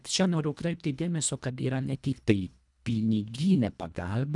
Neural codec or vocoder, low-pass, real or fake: codec, 24 kHz, 1.5 kbps, HILCodec; 10.8 kHz; fake